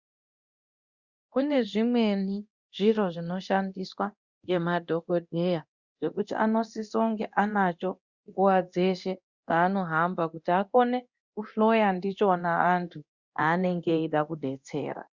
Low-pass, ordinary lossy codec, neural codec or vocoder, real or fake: 7.2 kHz; Opus, 64 kbps; codec, 24 kHz, 0.9 kbps, DualCodec; fake